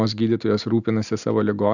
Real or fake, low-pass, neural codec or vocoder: real; 7.2 kHz; none